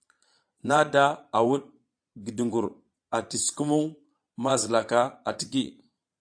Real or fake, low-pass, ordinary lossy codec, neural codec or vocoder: fake; 9.9 kHz; AAC, 64 kbps; vocoder, 22.05 kHz, 80 mel bands, Vocos